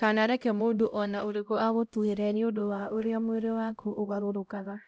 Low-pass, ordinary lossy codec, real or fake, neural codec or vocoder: none; none; fake; codec, 16 kHz, 0.5 kbps, X-Codec, HuBERT features, trained on LibriSpeech